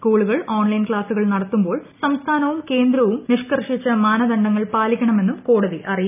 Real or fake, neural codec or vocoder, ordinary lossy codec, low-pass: real; none; none; 3.6 kHz